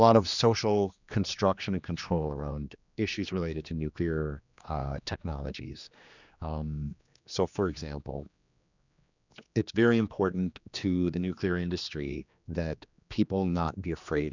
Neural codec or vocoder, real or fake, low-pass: codec, 16 kHz, 2 kbps, X-Codec, HuBERT features, trained on general audio; fake; 7.2 kHz